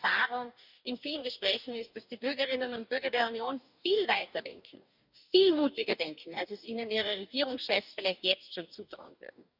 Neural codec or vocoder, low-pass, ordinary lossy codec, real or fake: codec, 44.1 kHz, 2.6 kbps, DAC; 5.4 kHz; none; fake